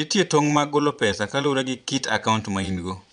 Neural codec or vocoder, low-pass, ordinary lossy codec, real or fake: vocoder, 22.05 kHz, 80 mel bands, Vocos; 9.9 kHz; none; fake